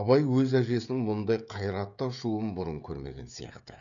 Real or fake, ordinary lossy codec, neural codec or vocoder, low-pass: fake; none; codec, 16 kHz, 16 kbps, FreqCodec, smaller model; 7.2 kHz